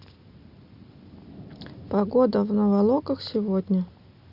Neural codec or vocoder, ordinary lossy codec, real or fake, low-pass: none; Opus, 64 kbps; real; 5.4 kHz